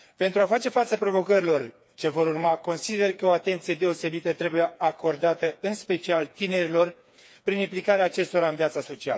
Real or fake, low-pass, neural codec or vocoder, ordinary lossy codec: fake; none; codec, 16 kHz, 4 kbps, FreqCodec, smaller model; none